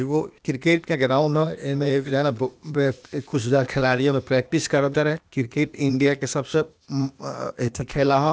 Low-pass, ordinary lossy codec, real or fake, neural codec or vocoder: none; none; fake; codec, 16 kHz, 0.8 kbps, ZipCodec